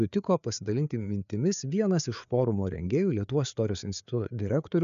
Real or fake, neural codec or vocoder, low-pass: fake; codec, 16 kHz, 4 kbps, FreqCodec, larger model; 7.2 kHz